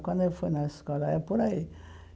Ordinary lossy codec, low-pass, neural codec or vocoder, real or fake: none; none; none; real